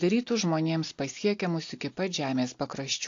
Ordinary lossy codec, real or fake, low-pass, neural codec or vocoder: AAC, 48 kbps; real; 7.2 kHz; none